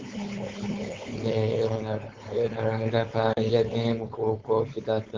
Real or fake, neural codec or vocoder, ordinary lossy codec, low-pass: fake; codec, 16 kHz, 4.8 kbps, FACodec; Opus, 16 kbps; 7.2 kHz